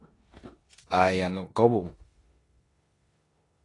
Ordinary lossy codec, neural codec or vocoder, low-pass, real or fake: AAC, 32 kbps; codec, 16 kHz in and 24 kHz out, 0.9 kbps, LongCat-Audio-Codec, four codebook decoder; 10.8 kHz; fake